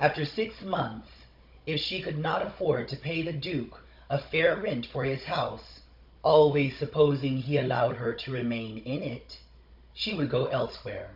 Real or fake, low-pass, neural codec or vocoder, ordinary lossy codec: fake; 5.4 kHz; codec, 16 kHz, 16 kbps, FunCodec, trained on Chinese and English, 50 frames a second; MP3, 48 kbps